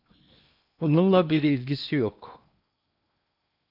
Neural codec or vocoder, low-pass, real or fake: codec, 16 kHz in and 24 kHz out, 0.8 kbps, FocalCodec, streaming, 65536 codes; 5.4 kHz; fake